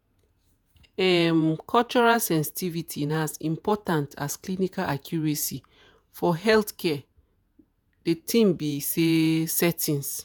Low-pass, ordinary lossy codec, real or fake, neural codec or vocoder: none; none; fake; vocoder, 48 kHz, 128 mel bands, Vocos